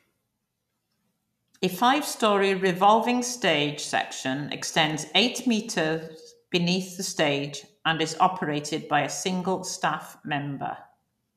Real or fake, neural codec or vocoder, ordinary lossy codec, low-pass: real; none; none; 14.4 kHz